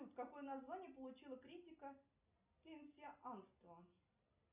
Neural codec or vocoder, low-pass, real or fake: none; 3.6 kHz; real